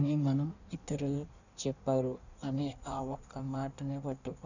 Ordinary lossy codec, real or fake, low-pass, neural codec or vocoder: none; fake; 7.2 kHz; codec, 16 kHz in and 24 kHz out, 1.1 kbps, FireRedTTS-2 codec